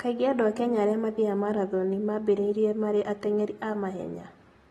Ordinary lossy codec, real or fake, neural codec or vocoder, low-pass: AAC, 32 kbps; real; none; 19.8 kHz